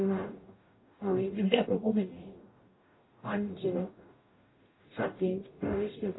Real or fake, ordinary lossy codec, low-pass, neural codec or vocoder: fake; AAC, 16 kbps; 7.2 kHz; codec, 44.1 kHz, 0.9 kbps, DAC